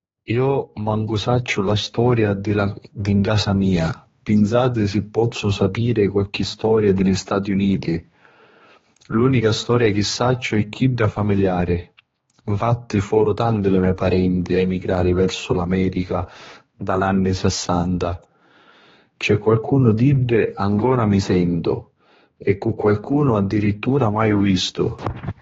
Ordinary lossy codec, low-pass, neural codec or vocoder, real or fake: AAC, 24 kbps; 7.2 kHz; codec, 16 kHz, 2 kbps, X-Codec, HuBERT features, trained on general audio; fake